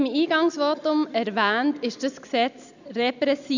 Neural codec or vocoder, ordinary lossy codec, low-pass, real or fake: none; none; 7.2 kHz; real